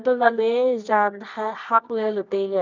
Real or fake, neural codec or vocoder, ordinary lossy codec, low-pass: fake; codec, 24 kHz, 0.9 kbps, WavTokenizer, medium music audio release; none; 7.2 kHz